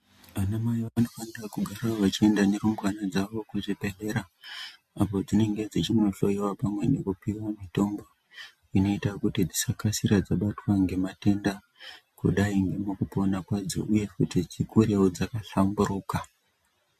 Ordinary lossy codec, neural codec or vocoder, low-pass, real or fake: MP3, 64 kbps; none; 14.4 kHz; real